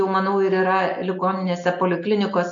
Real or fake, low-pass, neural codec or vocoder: real; 7.2 kHz; none